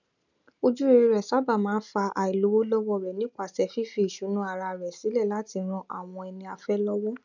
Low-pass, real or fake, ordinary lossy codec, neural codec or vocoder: 7.2 kHz; real; none; none